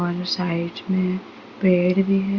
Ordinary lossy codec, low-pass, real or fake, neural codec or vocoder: Opus, 64 kbps; 7.2 kHz; real; none